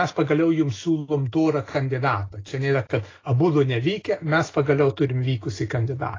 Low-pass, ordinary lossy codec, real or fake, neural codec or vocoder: 7.2 kHz; AAC, 32 kbps; real; none